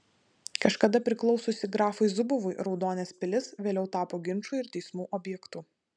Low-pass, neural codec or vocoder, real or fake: 9.9 kHz; none; real